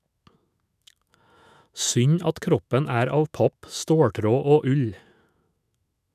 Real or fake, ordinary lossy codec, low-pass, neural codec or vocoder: fake; none; 14.4 kHz; autoencoder, 48 kHz, 128 numbers a frame, DAC-VAE, trained on Japanese speech